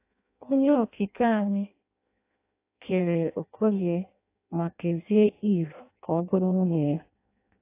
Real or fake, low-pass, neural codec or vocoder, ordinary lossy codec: fake; 3.6 kHz; codec, 16 kHz in and 24 kHz out, 0.6 kbps, FireRedTTS-2 codec; AAC, 24 kbps